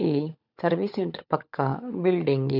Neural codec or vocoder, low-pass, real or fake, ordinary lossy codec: codec, 16 kHz, 16 kbps, FunCodec, trained on LibriTTS, 50 frames a second; 5.4 kHz; fake; none